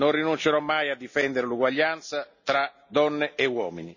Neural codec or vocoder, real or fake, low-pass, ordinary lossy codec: none; real; 7.2 kHz; none